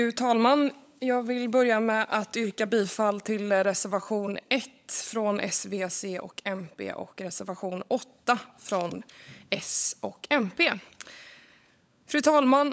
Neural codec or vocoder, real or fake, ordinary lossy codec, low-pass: codec, 16 kHz, 16 kbps, FunCodec, trained on LibriTTS, 50 frames a second; fake; none; none